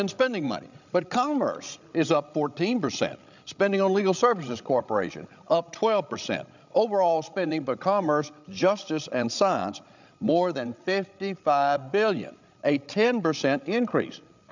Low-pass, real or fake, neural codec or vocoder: 7.2 kHz; fake; codec, 16 kHz, 16 kbps, FreqCodec, larger model